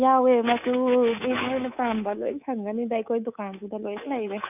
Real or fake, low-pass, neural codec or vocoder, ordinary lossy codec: fake; 3.6 kHz; codec, 24 kHz, 3.1 kbps, DualCodec; none